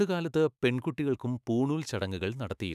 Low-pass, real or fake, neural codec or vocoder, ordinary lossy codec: 14.4 kHz; fake; autoencoder, 48 kHz, 128 numbers a frame, DAC-VAE, trained on Japanese speech; none